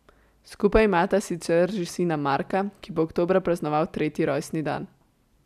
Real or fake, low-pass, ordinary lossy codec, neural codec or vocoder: real; 14.4 kHz; none; none